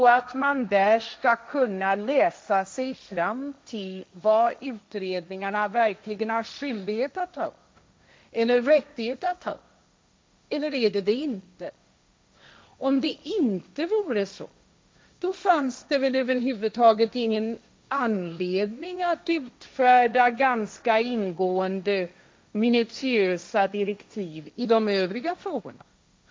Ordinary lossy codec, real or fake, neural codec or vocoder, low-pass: none; fake; codec, 16 kHz, 1.1 kbps, Voila-Tokenizer; none